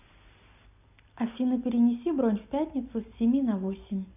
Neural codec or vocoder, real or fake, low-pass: none; real; 3.6 kHz